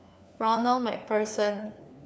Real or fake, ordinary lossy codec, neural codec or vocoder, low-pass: fake; none; codec, 16 kHz, 4 kbps, FunCodec, trained on LibriTTS, 50 frames a second; none